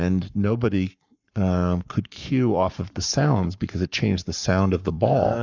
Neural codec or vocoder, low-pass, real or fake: codec, 44.1 kHz, 7.8 kbps, Pupu-Codec; 7.2 kHz; fake